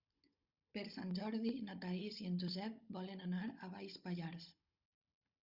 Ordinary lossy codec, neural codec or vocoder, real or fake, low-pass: Opus, 64 kbps; codec, 16 kHz, 16 kbps, FunCodec, trained on Chinese and English, 50 frames a second; fake; 5.4 kHz